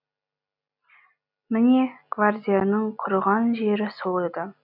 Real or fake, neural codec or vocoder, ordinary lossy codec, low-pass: real; none; none; 5.4 kHz